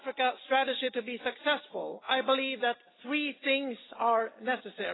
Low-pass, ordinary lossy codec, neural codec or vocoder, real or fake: 7.2 kHz; AAC, 16 kbps; none; real